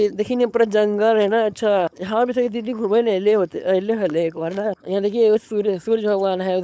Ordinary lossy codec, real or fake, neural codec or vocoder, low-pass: none; fake; codec, 16 kHz, 4.8 kbps, FACodec; none